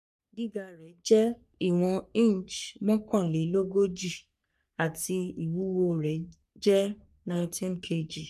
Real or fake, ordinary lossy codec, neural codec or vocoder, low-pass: fake; none; codec, 44.1 kHz, 3.4 kbps, Pupu-Codec; 14.4 kHz